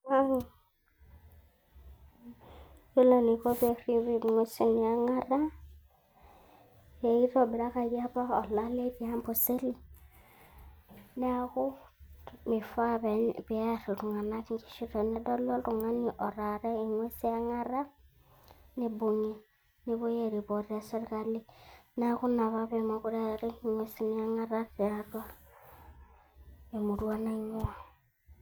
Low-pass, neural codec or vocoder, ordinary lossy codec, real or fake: none; none; none; real